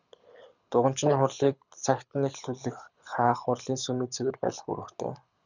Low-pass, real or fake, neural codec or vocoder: 7.2 kHz; fake; codec, 24 kHz, 6 kbps, HILCodec